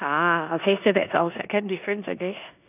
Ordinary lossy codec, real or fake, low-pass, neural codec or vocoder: none; fake; 3.6 kHz; codec, 16 kHz in and 24 kHz out, 0.9 kbps, LongCat-Audio-Codec, four codebook decoder